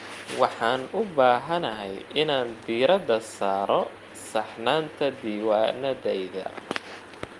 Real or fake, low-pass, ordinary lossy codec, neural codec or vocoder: real; 10.8 kHz; Opus, 32 kbps; none